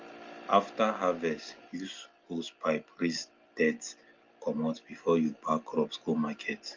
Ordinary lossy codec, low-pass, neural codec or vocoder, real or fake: Opus, 32 kbps; 7.2 kHz; none; real